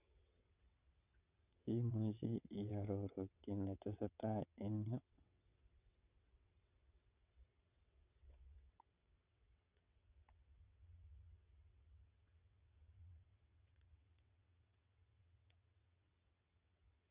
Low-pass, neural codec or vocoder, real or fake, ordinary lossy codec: 3.6 kHz; vocoder, 22.05 kHz, 80 mel bands, WaveNeXt; fake; none